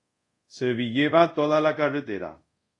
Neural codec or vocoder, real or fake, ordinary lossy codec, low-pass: codec, 24 kHz, 0.5 kbps, DualCodec; fake; AAC, 48 kbps; 10.8 kHz